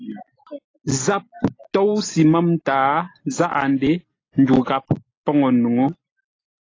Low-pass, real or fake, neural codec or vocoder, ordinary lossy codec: 7.2 kHz; real; none; AAC, 32 kbps